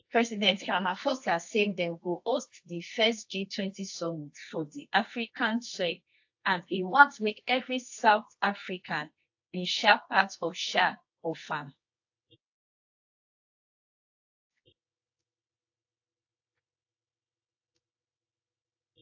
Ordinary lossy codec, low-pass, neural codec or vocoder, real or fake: AAC, 48 kbps; 7.2 kHz; codec, 24 kHz, 0.9 kbps, WavTokenizer, medium music audio release; fake